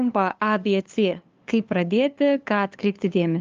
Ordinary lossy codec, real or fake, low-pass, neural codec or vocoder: Opus, 24 kbps; fake; 7.2 kHz; codec, 16 kHz, 2 kbps, FunCodec, trained on LibriTTS, 25 frames a second